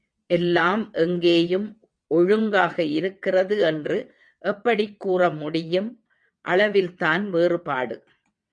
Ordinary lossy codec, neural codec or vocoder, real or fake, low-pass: MP3, 64 kbps; vocoder, 22.05 kHz, 80 mel bands, WaveNeXt; fake; 9.9 kHz